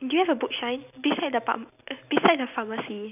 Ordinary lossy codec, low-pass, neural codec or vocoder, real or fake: none; 3.6 kHz; none; real